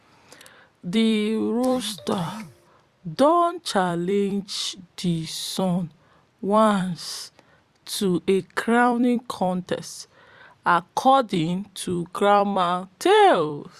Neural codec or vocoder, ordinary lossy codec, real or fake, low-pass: vocoder, 44.1 kHz, 128 mel bands every 256 samples, BigVGAN v2; Opus, 64 kbps; fake; 14.4 kHz